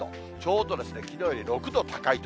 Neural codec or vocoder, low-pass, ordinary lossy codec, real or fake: none; none; none; real